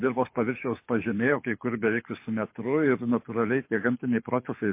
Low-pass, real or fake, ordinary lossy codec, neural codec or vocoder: 3.6 kHz; fake; MP3, 24 kbps; codec, 24 kHz, 6 kbps, HILCodec